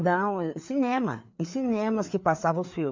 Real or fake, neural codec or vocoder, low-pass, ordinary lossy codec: fake; codec, 16 kHz, 4 kbps, FreqCodec, larger model; 7.2 kHz; MP3, 48 kbps